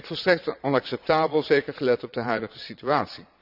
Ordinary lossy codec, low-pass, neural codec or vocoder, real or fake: none; 5.4 kHz; vocoder, 22.05 kHz, 80 mel bands, Vocos; fake